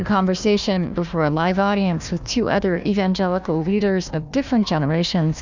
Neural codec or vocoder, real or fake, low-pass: codec, 16 kHz, 1 kbps, FunCodec, trained on Chinese and English, 50 frames a second; fake; 7.2 kHz